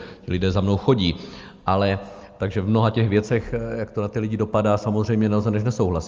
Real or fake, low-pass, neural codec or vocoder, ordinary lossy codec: real; 7.2 kHz; none; Opus, 32 kbps